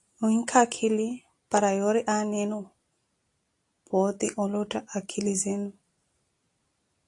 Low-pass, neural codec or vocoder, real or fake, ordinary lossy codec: 10.8 kHz; none; real; AAC, 48 kbps